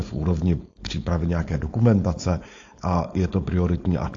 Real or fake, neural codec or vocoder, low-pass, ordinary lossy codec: fake; codec, 16 kHz, 4.8 kbps, FACodec; 7.2 kHz; AAC, 48 kbps